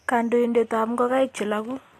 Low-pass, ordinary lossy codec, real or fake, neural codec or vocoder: 14.4 kHz; AAC, 48 kbps; real; none